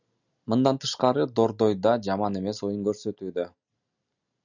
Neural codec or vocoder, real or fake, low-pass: none; real; 7.2 kHz